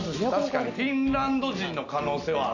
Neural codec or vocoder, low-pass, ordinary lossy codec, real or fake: none; 7.2 kHz; none; real